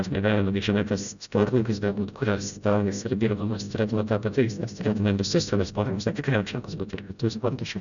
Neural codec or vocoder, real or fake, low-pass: codec, 16 kHz, 0.5 kbps, FreqCodec, smaller model; fake; 7.2 kHz